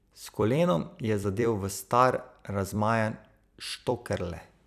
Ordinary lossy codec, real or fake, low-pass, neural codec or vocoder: none; fake; 14.4 kHz; vocoder, 44.1 kHz, 128 mel bands every 256 samples, BigVGAN v2